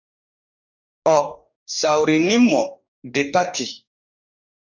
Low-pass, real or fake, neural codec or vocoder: 7.2 kHz; fake; codec, 44.1 kHz, 2.6 kbps, DAC